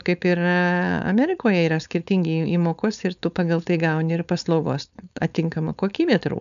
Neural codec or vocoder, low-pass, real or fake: codec, 16 kHz, 4.8 kbps, FACodec; 7.2 kHz; fake